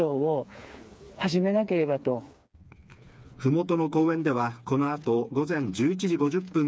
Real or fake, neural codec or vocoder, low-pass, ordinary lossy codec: fake; codec, 16 kHz, 4 kbps, FreqCodec, smaller model; none; none